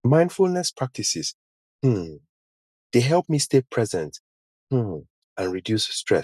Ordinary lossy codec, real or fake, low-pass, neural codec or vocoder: none; real; 14.4 kHz; none